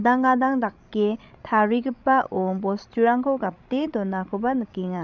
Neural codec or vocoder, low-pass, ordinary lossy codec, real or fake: codec, 16 kHz, 16 kbps, FreqCodec, larger model; 7.2 kHz; none; fake